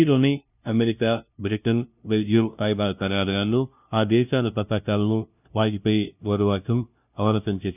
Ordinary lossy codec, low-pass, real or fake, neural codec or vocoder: none; 3.6 kHz; fake; codec, 16 kHz, 0.5 kbps, FunCodec, trained on LibriTTS, 25 frames a second